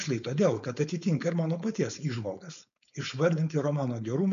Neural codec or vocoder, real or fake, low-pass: codec, 16 kHz, 4.8 kbps, FACodec; fake; 7.2 kHz